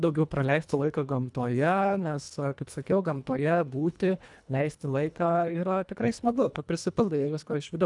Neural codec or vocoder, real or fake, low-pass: codec, 24 kHz, 1.5 kbps, HILCodec; fake; 10.8 kHz